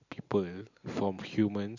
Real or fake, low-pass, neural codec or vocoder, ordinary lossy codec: real; 7.2 kHz; none; none